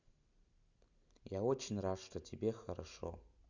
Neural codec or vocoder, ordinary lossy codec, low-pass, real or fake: none; none; 7.2 kHz; real